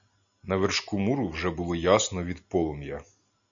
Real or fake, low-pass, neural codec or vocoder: real; 7.2 kHz; none